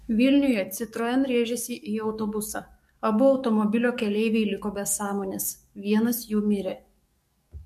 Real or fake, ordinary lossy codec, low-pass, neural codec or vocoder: fake; MP3, 64 kbps; 14.4 kHz; codec, 44.1 kHz, 7.8 kbps, DAC